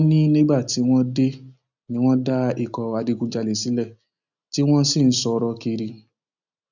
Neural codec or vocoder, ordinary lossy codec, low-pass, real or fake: none; none; 7.2 kHz; real